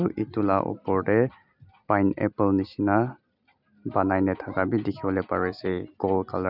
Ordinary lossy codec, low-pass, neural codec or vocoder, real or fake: none; 5.4 kHz; none; real